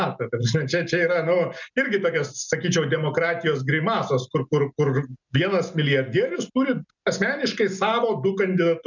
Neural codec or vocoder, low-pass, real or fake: none; 7.2 kHz; real